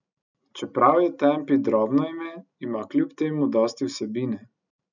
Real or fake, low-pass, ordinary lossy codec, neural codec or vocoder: real; 7.2 kHz; none; none